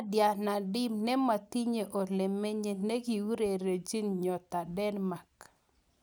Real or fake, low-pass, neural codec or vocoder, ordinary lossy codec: real; none; none; none